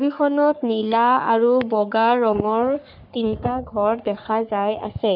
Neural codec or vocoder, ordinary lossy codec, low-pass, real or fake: codec, 44.1 kHz, 3.4 kbps, Pupu-Codec; none; 5.4 kHz; fake